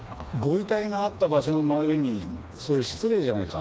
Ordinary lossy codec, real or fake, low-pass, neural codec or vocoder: none; fake; none; codec, 16 kHz, 2 kbps, FreqCodec, smaller model